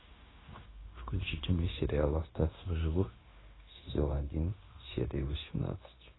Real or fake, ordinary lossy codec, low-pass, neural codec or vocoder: fake; AAC, 16 kbps; 7.2 kHz; codec, 16 kHz, 0.9 kbps, LongCat-Audio-Codec